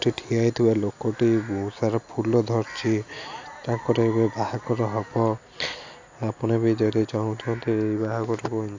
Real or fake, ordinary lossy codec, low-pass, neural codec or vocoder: real; AAC, 48 kbps; 7.2 kHz; none